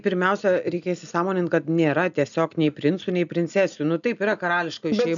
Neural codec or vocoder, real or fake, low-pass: none; real; 7.2 kHz